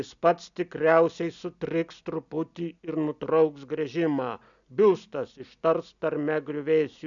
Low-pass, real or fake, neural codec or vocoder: 7.2 kHz; real; none